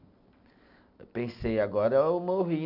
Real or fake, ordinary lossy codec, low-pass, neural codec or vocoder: real; none; 5.4 kHz; none